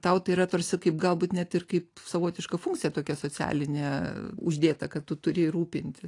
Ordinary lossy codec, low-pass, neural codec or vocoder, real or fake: AAC, 48 kbps; 10.8 kHz; none; real